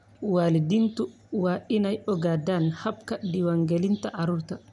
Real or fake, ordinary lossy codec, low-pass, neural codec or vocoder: real; none; 10.8 kHz; none